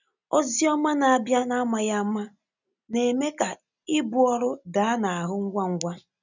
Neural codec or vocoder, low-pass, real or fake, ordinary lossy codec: none; 7.2 kHz; real; none